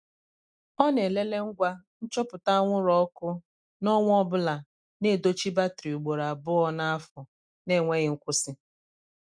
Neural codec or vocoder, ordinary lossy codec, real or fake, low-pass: none; none; real; 9.9 kHz